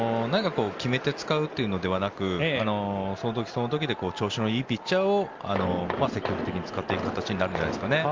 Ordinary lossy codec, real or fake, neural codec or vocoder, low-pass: Opus, 32 kbps; real; none; 7.2 kHz